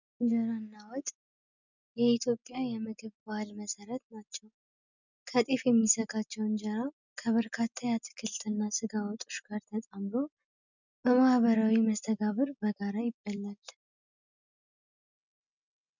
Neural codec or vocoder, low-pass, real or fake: none; 7.2 kHz; real